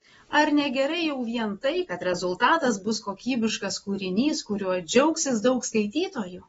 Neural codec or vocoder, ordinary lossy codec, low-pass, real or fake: none; AAC, 24 kbps; 19.8 kHz; real